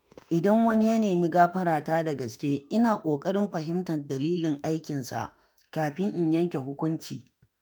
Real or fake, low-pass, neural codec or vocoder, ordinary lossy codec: fake; none; autoencoder, 48 kHz, 32 numbers a frame, DAC-VAE, trained on Japanese speech; none